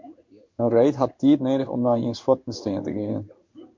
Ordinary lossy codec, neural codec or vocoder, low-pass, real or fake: MP3, 48 kbps; codec, 16 kHz in and 24 kHz out, 1 kbps, XY-Tokenizer; 7.2 kHz; fake